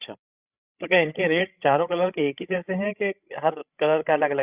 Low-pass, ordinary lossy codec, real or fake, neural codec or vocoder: 3.6 kHz; Opus, 64 kbps; fake; codec, 16 kHz, 16 kbps, FreqCodec, larger model